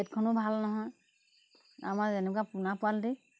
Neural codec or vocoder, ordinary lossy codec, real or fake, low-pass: none; none; real; none